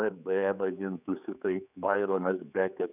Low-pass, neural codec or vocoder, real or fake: 3.6 kHz; codec, 16 kHz, 4 kbps, X-Codec, HuBERT features, trained on general audio; fake